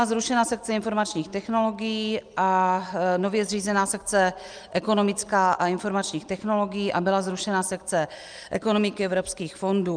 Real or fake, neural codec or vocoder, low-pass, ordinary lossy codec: real; none; 9.9 kHz; Opus, 32 kbps